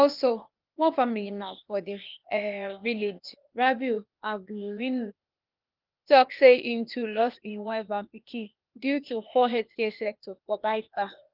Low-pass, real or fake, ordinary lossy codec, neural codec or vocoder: 5.4 kHz; fake; Opus, 24 kbps; codec, 16 kHz, 0.8 kbps, ZipCodec